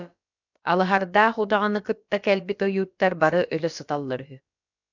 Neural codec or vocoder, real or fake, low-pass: codec, 16 kHz, about 1 kbps, DyCAST, with the encoder's durations; fake; 7.2 kHz